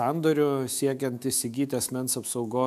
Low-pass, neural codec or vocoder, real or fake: 14.4 kHz; autoencoder, 48 kHz, 128 numbers a frame, DAC-VAE, trained on Japanese speech; fake